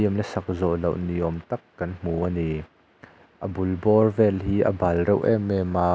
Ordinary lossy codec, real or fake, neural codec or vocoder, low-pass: none; real; none; none